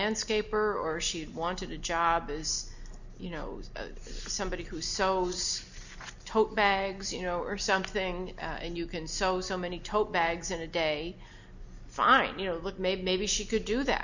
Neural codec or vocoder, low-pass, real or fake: none; 7.2 kHz; real